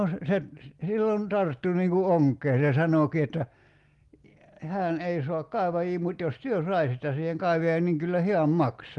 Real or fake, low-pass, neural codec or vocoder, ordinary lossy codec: real; 10.8 kHz; none; Opus, 24 kbps